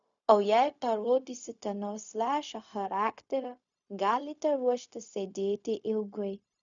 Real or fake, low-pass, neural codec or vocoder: fake; 7.2 kHz; codec, 16 kHz, 0.4 kbps, LongCat-Audio-Codec